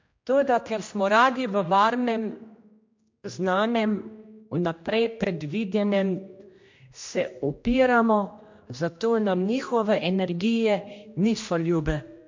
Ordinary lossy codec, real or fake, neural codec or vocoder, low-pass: MP3, 48 kbps; fake; codec, 16 kHz, 1 kbps, X-Codec, HuBERT features, trained on general audio; 7.2 kHz